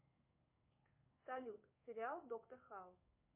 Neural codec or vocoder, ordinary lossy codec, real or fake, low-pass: codec, 16 kHz in and 24 kHz out, 1 kbps, XY-Tokenizer; MP3, 24 kbps; fake; 3.6 kHz